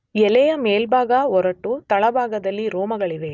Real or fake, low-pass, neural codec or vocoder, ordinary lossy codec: real; none; none; none